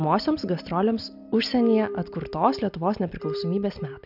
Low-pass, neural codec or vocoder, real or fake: 5.4 kHz; none; real